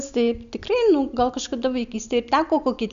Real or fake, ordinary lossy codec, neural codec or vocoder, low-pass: real; Opus, 64 kbps; none; 7.2 kHz